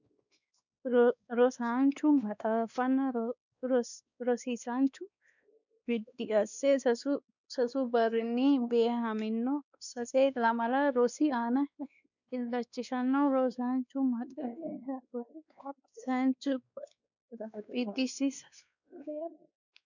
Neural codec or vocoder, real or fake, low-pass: codec, 16 kHz, 2 kbps, X-Codec, WavLM features, trained on Multilingual LibriSpeech; fake; 7.2 kHz